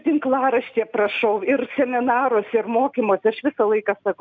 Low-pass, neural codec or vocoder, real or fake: 7.2 kHz; none; real